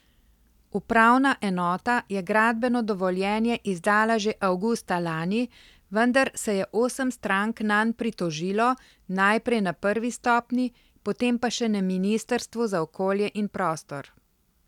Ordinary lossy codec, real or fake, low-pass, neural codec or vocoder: none; real; 19.8 kHz; none